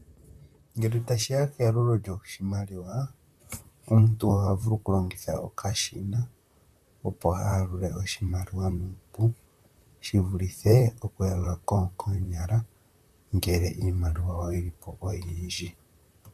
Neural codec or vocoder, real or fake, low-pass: vocoder, 44.1 kHz, 128 mel bands, Pupu-Vocoder; fake; 14.4 kHz